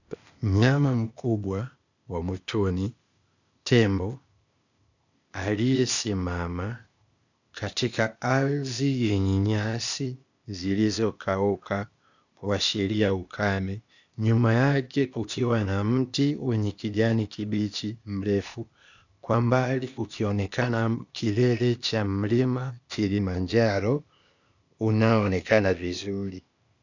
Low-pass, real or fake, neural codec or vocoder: 7.2 kHz; fake; codec, 16 kHz, 0.8 kbps, ZipCodec